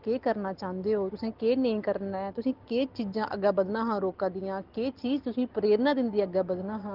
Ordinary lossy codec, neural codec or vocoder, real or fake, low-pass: Opus, 16 kbps; none; real; 5.4 kHz